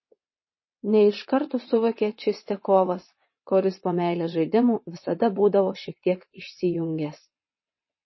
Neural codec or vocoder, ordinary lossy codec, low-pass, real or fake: vocoder, 22.05 kHz, 80 mel bands, WaveNeXt; MP3, 24 kbps; 7.2 kHz; fake